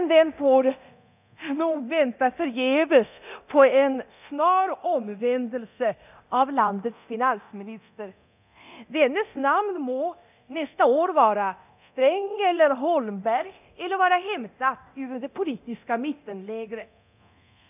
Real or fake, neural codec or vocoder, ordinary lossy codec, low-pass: fake; codec, 24 kHz, 0.9 kbps, DualCodec; none; 3.6 kHz